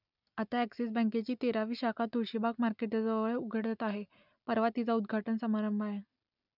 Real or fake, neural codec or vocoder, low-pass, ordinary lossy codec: real; none; 5.4 kHz; none